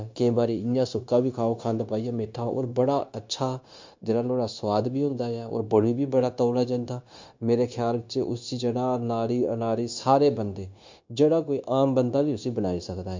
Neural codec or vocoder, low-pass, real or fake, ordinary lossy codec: codec, 16 kHz, 0.9 kbps, LongCat-Audio-Codec; 7.2 kHz; fake; MP3, 48 kbps